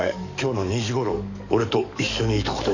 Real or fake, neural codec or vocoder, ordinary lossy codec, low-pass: fake; codec, 44.1 kHz, 7.8 kbps, DAC; none; 7.2 kHz